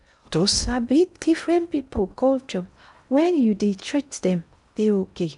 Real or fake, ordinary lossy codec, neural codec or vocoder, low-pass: fake; none; codec, 16 kHz in and 24 kHz out, 0.6 kbps, FocalCodec, streaming, 4096 codes; 10.8 kHz